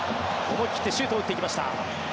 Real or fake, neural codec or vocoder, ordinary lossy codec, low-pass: real; none; none; none